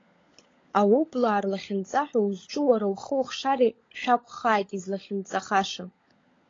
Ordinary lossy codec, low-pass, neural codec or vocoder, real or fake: AAC, 32 kbps; 7.2 kHz; codec, 16 kHz, 16 kbps, FunCodec, trained on LibriTTS, 50 frames a second; fake